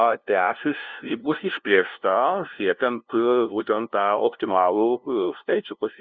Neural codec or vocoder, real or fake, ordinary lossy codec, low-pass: codec, 16 kHz, 0.5 kbps, FunCodec, trained on LibriTTS, 25 frames a second; fake; Opus, 64 kbps; 7.2 kHz